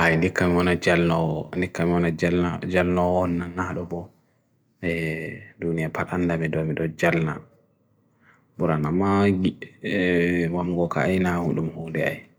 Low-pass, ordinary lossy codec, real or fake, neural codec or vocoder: none; none; real; none